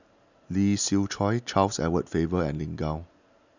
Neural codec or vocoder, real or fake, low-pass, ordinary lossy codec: none; real; 7.2 kHz; none